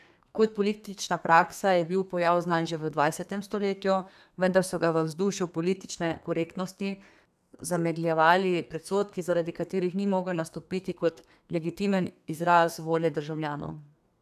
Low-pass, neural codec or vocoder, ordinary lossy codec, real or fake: 14.4 kHz; codec, 32 kHz, 1.9 kbps, SNAC; none; fake